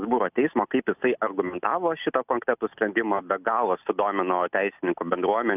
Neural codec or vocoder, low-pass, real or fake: none; 3.6 kHz; real